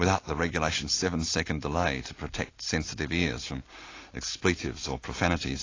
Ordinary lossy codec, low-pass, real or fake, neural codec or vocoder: AAC, 32 kbps; 7.2 kHz; real; none